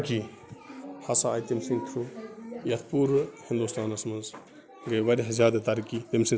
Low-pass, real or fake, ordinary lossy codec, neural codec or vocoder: none; real; none; none